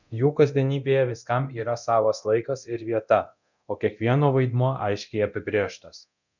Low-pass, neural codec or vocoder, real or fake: 7.2 kHz; codec, 24 kHz, 0.9 kbps, DualCodec; fake